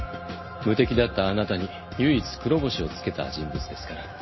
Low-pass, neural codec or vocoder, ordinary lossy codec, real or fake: 7.2 kHz; none; MP3, 24 kbps; real